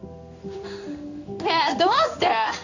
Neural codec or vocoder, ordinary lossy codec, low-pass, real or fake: codec, 16 kHz in and 24 kHz out, 1 kbps, XY-Tokenizer; none; 7.2 kHz; fake